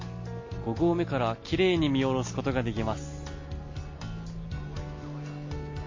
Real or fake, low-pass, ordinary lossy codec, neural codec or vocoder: real; 7.2 kHz; MP3, 32 kbps; none